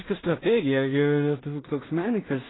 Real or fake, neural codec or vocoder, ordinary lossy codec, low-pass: fake; codec, 16 kHz in and 24 kHz out, 0.4 kbps, LongCat-Audio-Codec, two codebook decoder; AAC, 16 kbps; 7.2 kHz